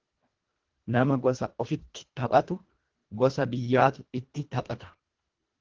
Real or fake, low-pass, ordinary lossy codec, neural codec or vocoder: fake; 7.2 kHz; Opus, 16 kbps; codec, 24 kHz, 1.5 kbps, HILCodec